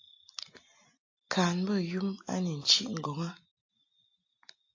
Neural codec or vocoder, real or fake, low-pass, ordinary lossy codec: none; real; 7.2 kHz; AAC, 48 kbps